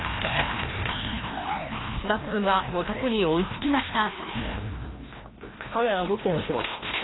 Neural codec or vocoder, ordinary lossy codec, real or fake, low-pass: codec, 16 kHz, 1 kbps, FreqCodec, larger model; AAC, 16 kbps; fake; 7.2 kHz